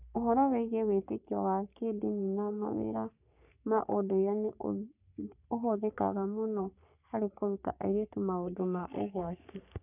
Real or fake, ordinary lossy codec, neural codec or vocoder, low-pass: fake; none; codec, 44.1 kHz, 3.4 kbps, Pupu-Codec; 3.6 kHz